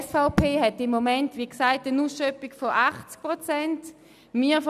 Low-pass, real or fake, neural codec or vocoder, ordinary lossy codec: 14.4 kHz; real; none; none